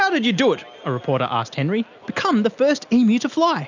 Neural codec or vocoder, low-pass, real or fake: none; 7.2 kHz; real